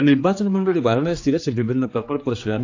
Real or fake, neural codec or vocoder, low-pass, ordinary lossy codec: fake; codec, 16 kHz, 2 kbps, X-Codec, HuBERT features, trained on general audio; 7.2 kHz; AAC, 48 kbps